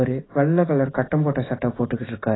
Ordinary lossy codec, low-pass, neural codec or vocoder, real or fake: AAC, 16 kbps; 7.2 kHz; none; real